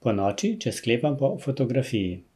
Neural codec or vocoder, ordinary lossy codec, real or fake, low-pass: none; none; real; 14.4 kHz